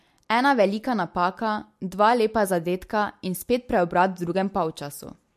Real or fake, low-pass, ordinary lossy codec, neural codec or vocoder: real; 14.4 kHz; MP3, 64 kbps; none